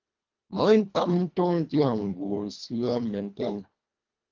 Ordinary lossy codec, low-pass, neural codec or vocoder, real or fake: Opus, 32 kbps; 7.2 kHz; codec, 24 kHz, 1.5 kbps, HILCodec; fake